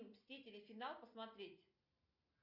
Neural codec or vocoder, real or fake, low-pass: none; real; 5.4 kHz